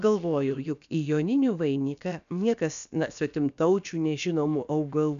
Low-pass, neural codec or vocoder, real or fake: 7.2 kHz; codec, 16 kHz, about 1 kbps, DyCAST, with the encoder's durations; fake